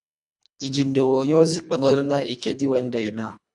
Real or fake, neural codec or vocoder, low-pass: fake; codec, 24 kHz, 1.5 kbps, HILCodec; 10.8 kHz